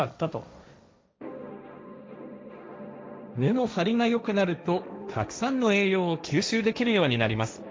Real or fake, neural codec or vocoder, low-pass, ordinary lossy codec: fake; codec, 16 kHz, 1.1 kbps, Voila-Tokenizer; none; none